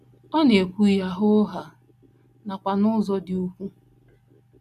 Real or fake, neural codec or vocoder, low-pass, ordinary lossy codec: real; none; 14.4 kHz; none